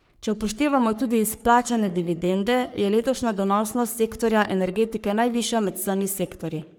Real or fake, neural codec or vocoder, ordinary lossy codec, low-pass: fake; codec, 44.1 kHz, 3.4 kbps, Pupu-Codec; none; none